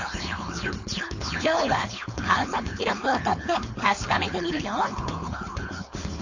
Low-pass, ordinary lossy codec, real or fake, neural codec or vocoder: 7.2 kHz; AAC, 48 kbps; fake; codec, 16 kHz, 4.8 kbps, FACodec